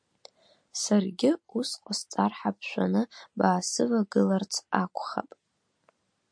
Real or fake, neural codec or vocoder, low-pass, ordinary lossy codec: real; none; 9.9 kHz; MP3, 48 kbps